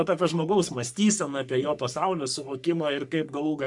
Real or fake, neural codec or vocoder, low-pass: fake; codec, 44.1 kHz, 3.4 kbps, Pupu-Codec; 10.8 kHz